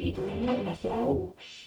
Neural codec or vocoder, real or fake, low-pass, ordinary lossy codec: codec, 44.1 kHz, 0.9 kbps, DAC; fake; 19.8 kHz; none